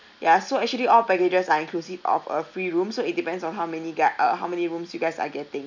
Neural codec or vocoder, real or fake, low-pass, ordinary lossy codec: none; real; 7.2 kHz; none